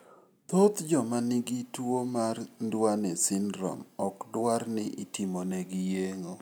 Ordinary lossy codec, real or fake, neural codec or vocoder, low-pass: none; real; none; none